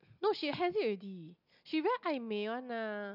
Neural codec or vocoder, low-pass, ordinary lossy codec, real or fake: none; 5.4 kHz; none; real